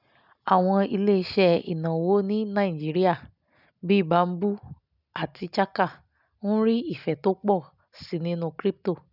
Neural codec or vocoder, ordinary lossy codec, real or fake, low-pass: none; none; real; 5.4 kHz